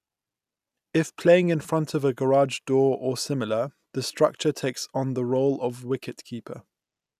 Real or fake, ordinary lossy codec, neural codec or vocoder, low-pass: real; none; none; 14.4 kHz